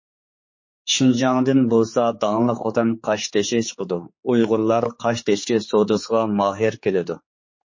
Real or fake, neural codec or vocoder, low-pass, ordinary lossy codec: fake; codec, 16 kHz, 4 kbps, X-Codec, HuBERT features, trained on general audio; 7.2 kHz; MP3, 32 kbps